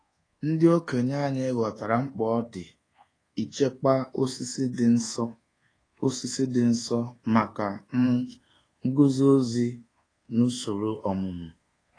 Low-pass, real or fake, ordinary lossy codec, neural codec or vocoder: 9.9 kHz; fake; AAC, 32 kbps; codec, 24 kHz, 1.2 kbps, DualCodec